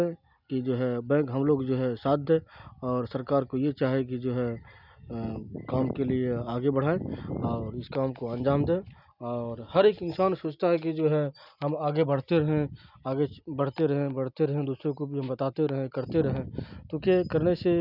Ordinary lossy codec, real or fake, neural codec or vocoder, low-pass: none; real; none; 5.4 kHz